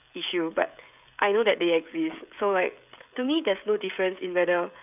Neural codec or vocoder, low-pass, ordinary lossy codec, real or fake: codec, 16 kHz, 16 kbps, FreqCodec, smaller model; 3.6 kHz; none; fake